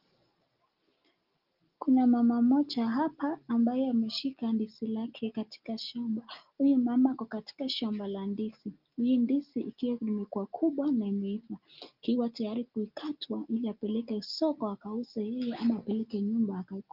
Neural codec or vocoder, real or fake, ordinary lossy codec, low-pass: none; real; Opus, 32 kbps; 5.4 kHz